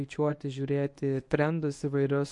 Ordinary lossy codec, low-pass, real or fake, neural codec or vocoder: MP3, 48 kbps; 10.8 kHz; fake; codec, 24 kHz, 0.9 kbps, WavTokenizer, medium speech release version 1